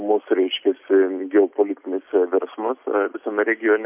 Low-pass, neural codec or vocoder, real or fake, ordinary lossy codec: 3.6 kHz; none; real; MP3, 32 kbps